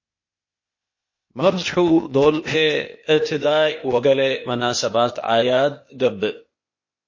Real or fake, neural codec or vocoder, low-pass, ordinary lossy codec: fake; codec, 16 kHz, 0.8 kbps, ZipCodec; 7.2 kHz; MP3, 32 kbps